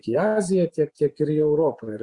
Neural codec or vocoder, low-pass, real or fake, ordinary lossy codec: vocoder, 24 kHz, 100 mel bands, Vocos; 10.8 kHz; fake; Opus, 64 kbps